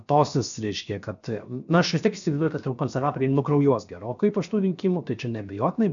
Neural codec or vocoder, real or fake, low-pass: codec, 16 kHz, 0.7 kbps, FocalCodec; fake; 7.2 kHz